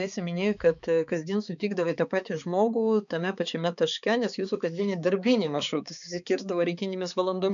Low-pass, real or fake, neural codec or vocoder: 7.2 kHz; fake; codec, 16 kHz, 4 kbps, X-Codec, HuBERT features, trained on balanced general audio